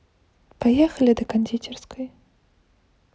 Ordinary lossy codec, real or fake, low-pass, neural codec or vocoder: none; real; none; none